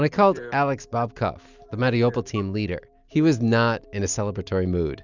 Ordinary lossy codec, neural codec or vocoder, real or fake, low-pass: Opus, 64 kbps; none; real; 7.2 kHz